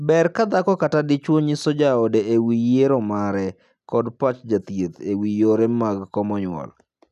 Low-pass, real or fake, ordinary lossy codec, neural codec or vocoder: 14.4 kHz; real; none; none